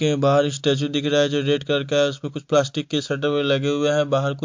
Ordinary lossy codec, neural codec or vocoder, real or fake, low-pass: MP3, 48 kbps; none; real; 7.2 kHz